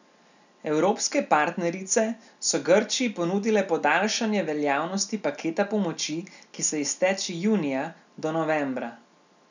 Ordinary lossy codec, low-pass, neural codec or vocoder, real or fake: none; 7.2 kHz; none; real